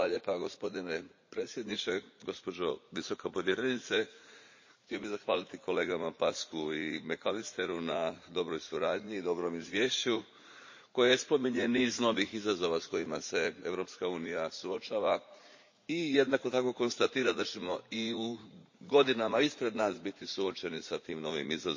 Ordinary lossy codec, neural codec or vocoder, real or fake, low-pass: MP3, 32 kbps; vocoder, 44.1 kHz, 80 mel bands, Vocos; fake; 7.2 kHz